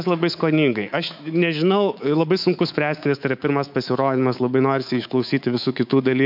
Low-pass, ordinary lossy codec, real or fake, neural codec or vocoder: 5.4 kHz; MP3, 48 kbps; fake; codec, 24 kHz, 3.1 kbps, DualCodec